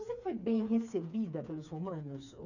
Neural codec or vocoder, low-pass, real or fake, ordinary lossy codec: codec, 16 kHz, 4 kbps, FreqCodec, smaller model; 7.2 kHz; fake; none